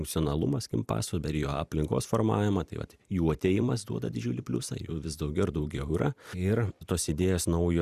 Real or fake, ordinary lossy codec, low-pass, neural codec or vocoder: fake; Opus, 64 kbps; 14.4 kHz; vocoder, 44.1 kHz, 128 mel bands every 256 samples, BigVGAN v2